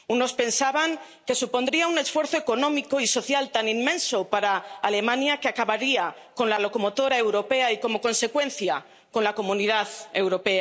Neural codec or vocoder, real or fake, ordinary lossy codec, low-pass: none; real; none; none